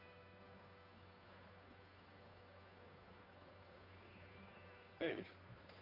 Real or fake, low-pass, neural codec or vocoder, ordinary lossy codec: fake; 5.4 kHz; codec, 16 kHz, 2 kbps, FunCodec, trained on Chinese and English, 25 frames a second; none